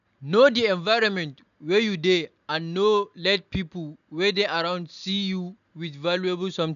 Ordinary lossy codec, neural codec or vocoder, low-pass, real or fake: none; none; 7.2 kHz; real